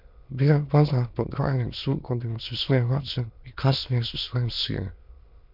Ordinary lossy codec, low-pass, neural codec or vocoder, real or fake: MP3, 48 kbps; 5.4 kHz; autoencoder, 22.05 kHz, a latent of 192 numbers a frame, VITS, trained on many speakers; fake